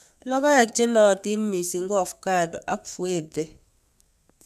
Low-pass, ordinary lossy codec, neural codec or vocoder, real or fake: 14.4 kHz; none; codec, 32 kHz, 1.9 kbps, SNAC; fake